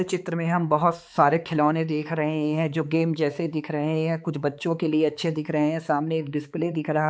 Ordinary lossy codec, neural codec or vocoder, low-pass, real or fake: none; codec, 16 kHz, 4 kbps, X-Codec, HuBERT features, trained on balanced general audio; none; fake